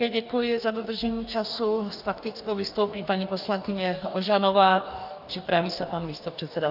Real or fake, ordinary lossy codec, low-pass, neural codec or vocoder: fake; AAC, 48 kbps; 5.4 kHz; codec, 44.1 kHz, 2.6 kbps, DAC